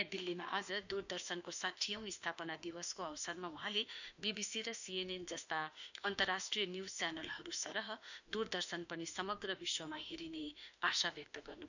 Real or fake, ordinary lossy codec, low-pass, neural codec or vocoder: fake; none; 7.2 kHz; autoencoder, 48 kHz, 32 numbers a frame, DAC-VAE, trained on Japanese speech